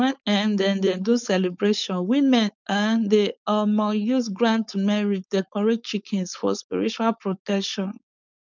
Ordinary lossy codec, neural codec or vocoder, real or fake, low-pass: none; codec, 16 kHz, 4.8 kbps, FACodec; fake; 7.2 kHz